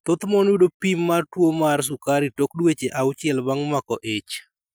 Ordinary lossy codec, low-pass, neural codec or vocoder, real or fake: none; none; none; real